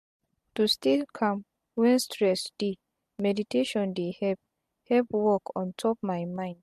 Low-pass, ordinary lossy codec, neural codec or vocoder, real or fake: 14.4 kHz; MP3, 64 kbps; none; real